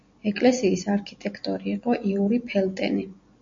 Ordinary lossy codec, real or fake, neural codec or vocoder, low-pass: MP3, 48 kbps; real; none; 7.2 kHz